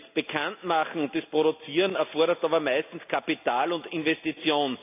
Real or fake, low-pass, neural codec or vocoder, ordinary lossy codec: real; 3.6 kHz; none; AAC, 32 kbps